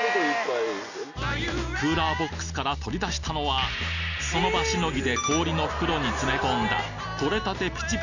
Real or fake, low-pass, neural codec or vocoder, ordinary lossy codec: real; 7.2 kHz; none; none